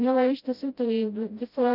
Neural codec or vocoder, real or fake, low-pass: codec, 16 kHz, 0.5 kbps, FreqCodec, smaller model; fake; 5.4 kHz